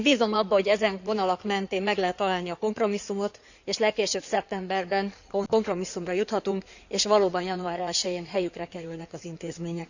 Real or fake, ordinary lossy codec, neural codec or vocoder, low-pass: fake; none; codec, 16 kHz in and 24 kHz out, 2.2 kbps, FireRedTTS-2 codec; 7.2 kHz